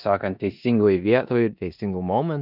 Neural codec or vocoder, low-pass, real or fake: codec, 16 kHz in and 24 kHz out, 0.9 kbps, LongCat-Audio-Codec, four codebook decoder; 5.4 kHz; fake